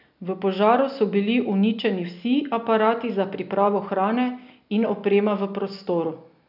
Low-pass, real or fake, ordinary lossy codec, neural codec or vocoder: 5.4 kHz; real; none; none